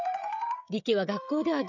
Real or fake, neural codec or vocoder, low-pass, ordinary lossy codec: fake; codec, 16 kHz, 16 kbps, FreqCodec, smaller model; 7.2 kHz; none